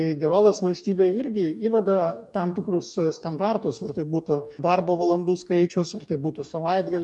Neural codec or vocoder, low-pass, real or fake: codec, 44.1 kHz, 2.6 kbps, DAC; 10.8 kHz; fake